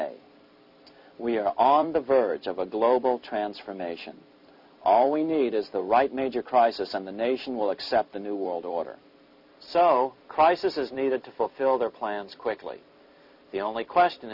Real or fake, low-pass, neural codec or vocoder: fake; 5.4 kHz; vocoder, 44.1 kHz, 128 mel bands every 256 samples, BigVGAN v2